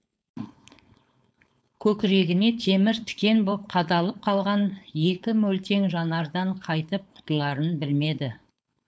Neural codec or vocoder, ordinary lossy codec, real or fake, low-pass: codec, 16 kHz, 4.8 kbps, FACodec; none; fake; none